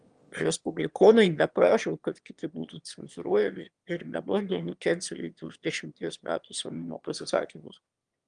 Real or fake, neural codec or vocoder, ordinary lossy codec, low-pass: fake; autoencoder, 22.05 kHz, a latent of 192 numbers a frame, VITS, trained on one speaker; Opus, 32 kbps; 9.9 kHz